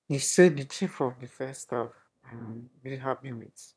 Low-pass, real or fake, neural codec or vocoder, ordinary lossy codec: none; fake; autoencoder, 22.05 kHz, a latent of 192 numbers a frame, VITS, trained on one speaker; none